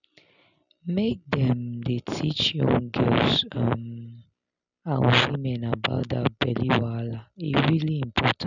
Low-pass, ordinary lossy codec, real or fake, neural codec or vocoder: 7.2 kHz; none; real; none